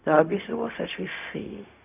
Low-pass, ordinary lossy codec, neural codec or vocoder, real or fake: 3.6 kHz; none; codec, 16 kHz, 0.4 kbps, LongCat-Audio-Codec; fake